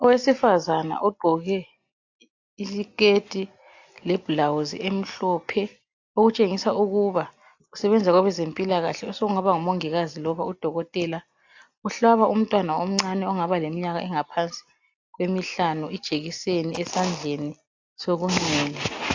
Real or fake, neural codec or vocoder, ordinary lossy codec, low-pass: real; none; AAC, 48 kbps; 7.2 kHz